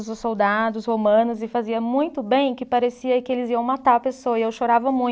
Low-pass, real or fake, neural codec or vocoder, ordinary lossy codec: none; real; none; none